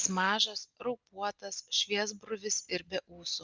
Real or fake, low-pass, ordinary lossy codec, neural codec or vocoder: real; 7.2 kHz; Opus, 24 kbps; none